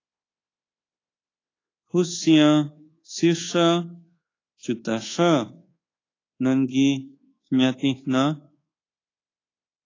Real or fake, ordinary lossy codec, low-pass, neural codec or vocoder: fake; AAC, 32 kbps; 7.2 kHz; codec, 24 kHz, 1.2 kbps, DualCodec